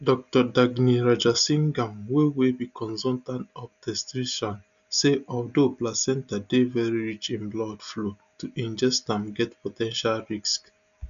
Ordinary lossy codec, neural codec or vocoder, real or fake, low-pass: none; none; real; 7.2 kHz